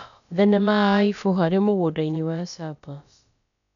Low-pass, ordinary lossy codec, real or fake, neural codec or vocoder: 7.2 kHz; none; fake; codec, 16 kHz, about 1 kbps, DyCAST, with the encoder's durations